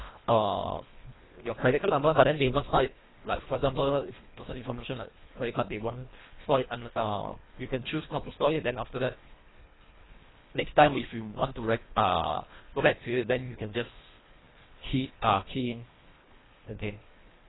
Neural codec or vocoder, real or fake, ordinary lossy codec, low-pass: codec, 24 kHz, 1.5 kbps, HILCodec; fake; AAC, 16 kbps; 7.2 kHz